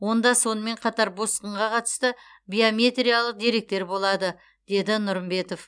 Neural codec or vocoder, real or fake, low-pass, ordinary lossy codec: none; real; 9.9 kHz; none